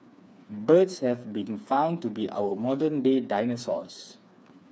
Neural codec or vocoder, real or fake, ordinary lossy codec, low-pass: codec, 16 kHz, 4 kbps, FreqCodec, smaller model; fake; none; none